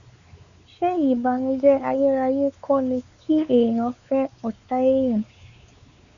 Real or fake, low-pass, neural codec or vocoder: fake; 7.2 kHz; codec, 16 kHz, 4 kbps, X-Codec, WavLM features, trained on Multilingual LibriSpeech